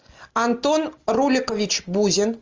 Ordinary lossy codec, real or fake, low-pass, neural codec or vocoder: Opus, 24 kbps; real; 7.2 kHz; none